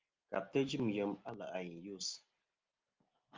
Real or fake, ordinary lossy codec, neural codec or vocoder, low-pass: real; Opus, 24 kbps; none; 7.2 kHz